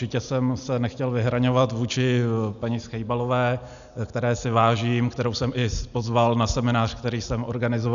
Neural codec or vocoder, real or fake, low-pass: none; real; 7.2 kHz